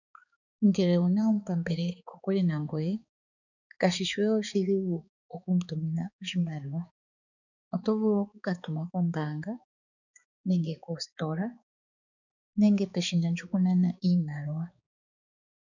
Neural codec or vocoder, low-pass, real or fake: codec, 16 kHz, 4 kbps, X-Codec, HuBERT features, trained on balanced general audio; 7.2 kHz; fake